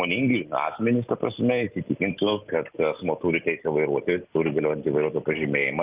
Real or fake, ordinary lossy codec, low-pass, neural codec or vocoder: real; Opus, 24 kbps; 3.6 kHz; none